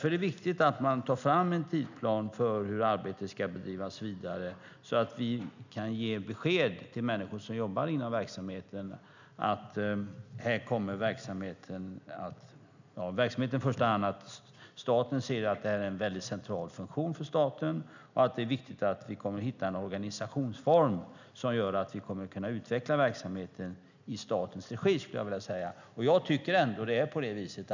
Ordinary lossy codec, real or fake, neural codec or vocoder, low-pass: none; real; none; 7.2 kHz